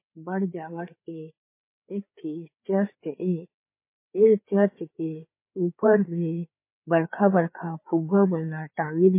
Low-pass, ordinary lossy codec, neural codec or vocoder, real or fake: 3.6 kHz; MP3, 24 kbps; codec, 16 kHz, 4 kbps, FreqCodec, larger model; fake